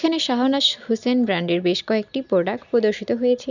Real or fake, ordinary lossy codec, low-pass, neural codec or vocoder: real; none; 7.2 kHz; none